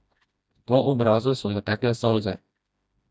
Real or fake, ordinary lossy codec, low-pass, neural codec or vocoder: fake; none; none; codec, 16 kHz, 1 kbps, FreqCodec, smaller model